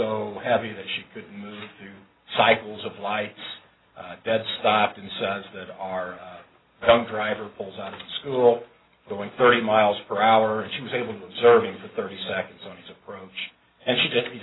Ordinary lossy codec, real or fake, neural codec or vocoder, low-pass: AAC, 16 kbps; real; none; 7.2 kHz